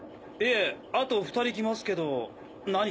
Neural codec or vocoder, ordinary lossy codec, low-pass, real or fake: none; none; none; real